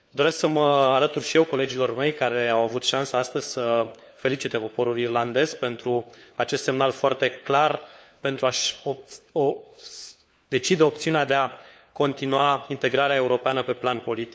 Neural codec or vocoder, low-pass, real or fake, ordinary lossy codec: codec, 16 kHz, 4 kbps, FunCodec, trained on LibriTTS, 50 frames a second; none; fake; none